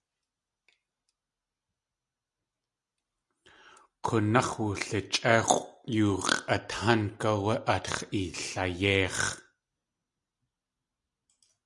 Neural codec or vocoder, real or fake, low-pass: none; real; 10.8 kHz